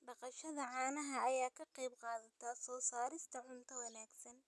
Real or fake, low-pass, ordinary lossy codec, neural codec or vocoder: real; none; none; none